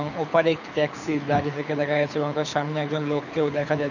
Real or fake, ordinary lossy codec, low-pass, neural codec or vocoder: fake; none; 7.2 kHz; codec, 24 kHz, 6 kbps, HILCodec